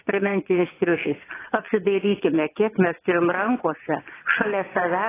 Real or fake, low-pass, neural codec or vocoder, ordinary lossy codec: fake; 3.6 kHz; vocoder, 22.05 kHz, 80 mel bands, WaveNeXt; AAC, 16 kbps